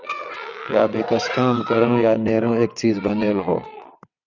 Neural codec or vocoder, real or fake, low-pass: vocoder, 22.05 kHz, 80 mel bands, WaveNeXt; fake; 7.2 kHz